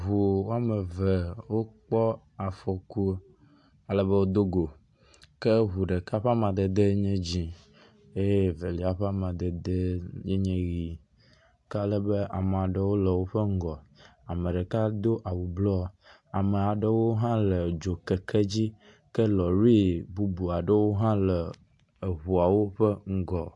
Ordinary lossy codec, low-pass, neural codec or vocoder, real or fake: AAC, 64 kbps; 10.8 kHz; none; real